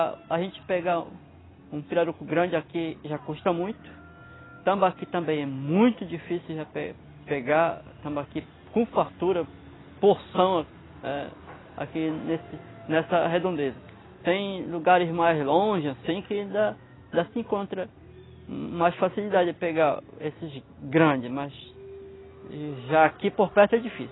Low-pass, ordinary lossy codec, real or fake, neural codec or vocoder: 7.2 kHz; AAC, 16 kbps; real; none